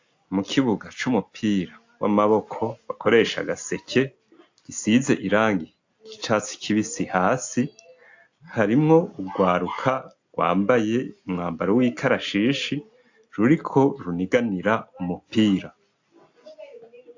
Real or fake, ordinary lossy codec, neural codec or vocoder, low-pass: real; AAC, 48 kbps; none; 7.2 kHz